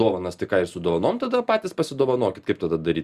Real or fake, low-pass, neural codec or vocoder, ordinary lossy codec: real; 14.4 kHz; none; Opus, 64 kbps